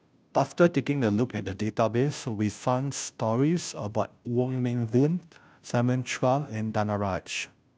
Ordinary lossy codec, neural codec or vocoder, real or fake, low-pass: none; codec, 16 kHz, 0.5 kbps, FunCodec, trained on Chinese and English, 25 frames a second; fake; none